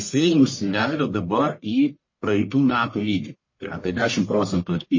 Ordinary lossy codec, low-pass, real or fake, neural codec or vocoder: MP3, 32 kbps; 7.2 kHz; fake; codec, 44.1 kHz, 1.7 kbps, Pupu-Codec